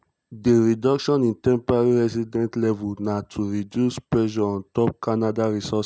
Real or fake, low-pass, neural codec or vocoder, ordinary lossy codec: real; none; none; none